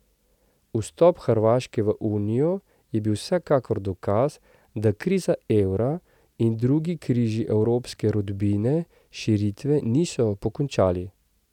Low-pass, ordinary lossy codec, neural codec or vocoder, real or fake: 19.8 kHz; none; none; real